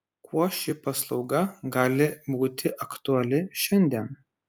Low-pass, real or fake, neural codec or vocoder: 19.8 kHz; real; none